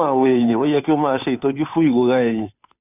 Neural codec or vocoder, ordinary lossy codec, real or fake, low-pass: codec, 16 kHz, 2 kbps, FunCodec, trained on Chinese and English, 25 frames a second; none; fake; 3.6 kHz